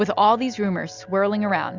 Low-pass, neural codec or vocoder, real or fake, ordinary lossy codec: 7.2 kHz; none; real; Opus, 64 kbps